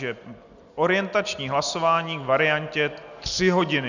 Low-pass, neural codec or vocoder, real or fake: 7.2 kHz; none; real